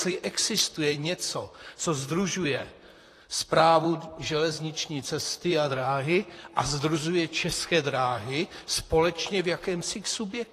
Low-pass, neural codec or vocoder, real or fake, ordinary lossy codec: 14.4 kHz; vocoder, 44.1 kHz, 128 mel bands, Pupu-Vocoder; fake; AAC, 48 kbps